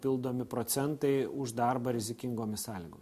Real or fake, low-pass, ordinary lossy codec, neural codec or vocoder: real; 14.4 kHz; MP3, 64 kbps; none